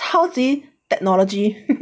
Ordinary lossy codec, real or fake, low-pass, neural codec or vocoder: none; real; none; none